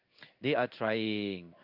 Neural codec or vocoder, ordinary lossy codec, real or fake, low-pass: codec, 16 kHz in and 24 kHz out, 1 kbps, XY-Tokenizer; Opus, 64 kbps; fake; 5.4 kHz